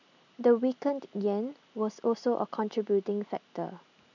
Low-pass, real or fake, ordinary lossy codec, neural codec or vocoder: 7.2 kHz; real; none; none